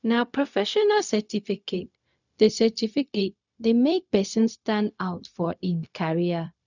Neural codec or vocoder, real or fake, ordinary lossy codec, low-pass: codec, 16 kHz, 0.4 kbps, LongCat-Audio-Codec; fake; none; 7.2 kHz